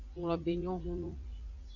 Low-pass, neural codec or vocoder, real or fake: 7.2 kHz; vocoder, 44.1 kHz, 80 mel bands, Vocos; fake